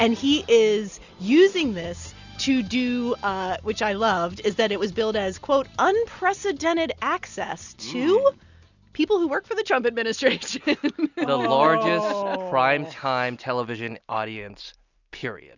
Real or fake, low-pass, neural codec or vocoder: real; 7.2 kHz; none